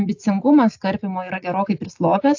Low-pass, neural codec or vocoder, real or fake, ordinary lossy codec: 7.2 kHz; none; real; AAC, 48 kbps